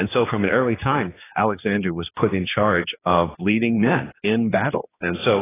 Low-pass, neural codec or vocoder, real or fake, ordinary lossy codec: 3.6 kHz; codec, 16 kHz, 2 kbps, X-Codec, HuBERT features, trained on general audio; fake; AAC, 16 kbps